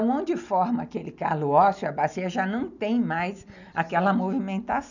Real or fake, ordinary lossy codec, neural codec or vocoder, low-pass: real; none; none; 7.2 kHz